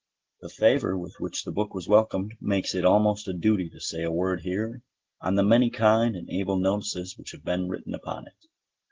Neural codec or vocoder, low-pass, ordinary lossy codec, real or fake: none; 7.2 kHz; Opus, 16 kbps; real